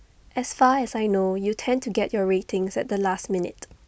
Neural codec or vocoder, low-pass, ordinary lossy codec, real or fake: none; none; none; real